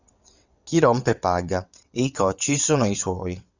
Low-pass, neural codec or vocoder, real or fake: 7.2 kHz; vocoder, 22.05 kHz, 80 mel bands, WaveNeXt; fake